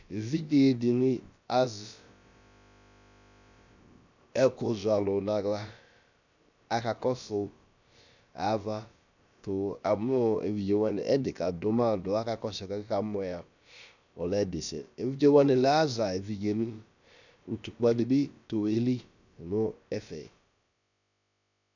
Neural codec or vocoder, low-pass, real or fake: codec, 16 kHz, about 1 kbps, DyCAST, with the encoder's durations; 7.2 kHz; fake